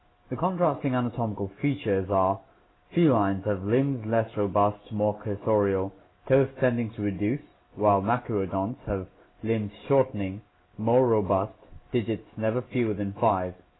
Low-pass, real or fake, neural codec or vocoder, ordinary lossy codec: 7.2 kHz; real; none; AAC, 16 kbps